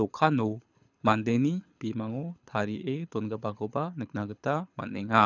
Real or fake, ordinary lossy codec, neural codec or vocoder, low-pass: fake; none; codec, 24 kHz, 6 kbps, HILCodec; 7.2 kHz